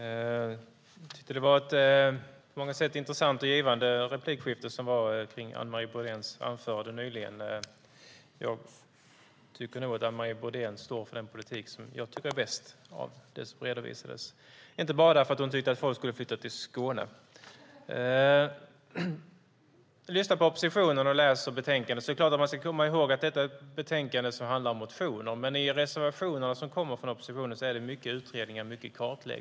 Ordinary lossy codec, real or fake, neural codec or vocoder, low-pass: none; real; none; none